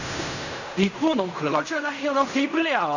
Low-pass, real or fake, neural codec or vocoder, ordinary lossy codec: 7.2 kHz; fake; codec, 16 kHz in and 24 kHz out, 0.4 kbps, LongCat-Audio-Codec, fine tuned four codebook decoder; none